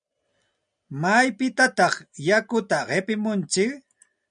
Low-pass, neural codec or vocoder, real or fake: 9.9 kHz; none; real